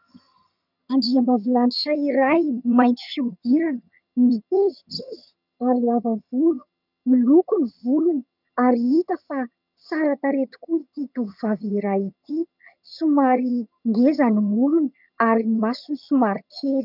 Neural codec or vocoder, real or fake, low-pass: vocoder, 22.05 kHz, 80 mel bands, HiFi-GAN; fake; 5.4 kHz